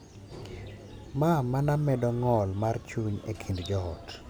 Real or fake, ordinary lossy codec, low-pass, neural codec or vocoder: real; none; none; none